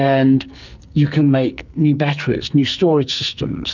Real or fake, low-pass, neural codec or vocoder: fake; 7.2 kHz; codec, 44.1 kHz, 2.6 kbps, SNAC